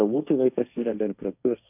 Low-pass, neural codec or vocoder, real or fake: 3.6 kHz; codec, 16 kHz, 1.1 kbps, Voila-Tokenizer; fake